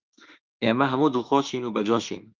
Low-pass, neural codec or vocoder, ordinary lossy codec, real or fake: 7.2 kHz; autoencoder, 48 kHz, 32 numbers a frame, DAC-VAE, trained on Japanese speech; Opus, 24 kbps; fake